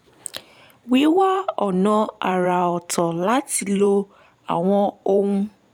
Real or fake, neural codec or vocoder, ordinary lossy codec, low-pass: fake; vocoder, 44.1 kHz, 128 mel bands every 512 samples, BigVGAN v2; none; 19.8 kHz